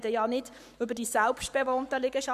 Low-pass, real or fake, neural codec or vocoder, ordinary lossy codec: 14.4 kHz; fake; codec, 44.1 kHz, 7.8 kbps, Pupu-Codec; none